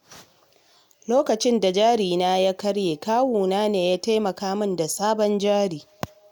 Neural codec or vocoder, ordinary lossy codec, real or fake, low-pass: none; none; real; none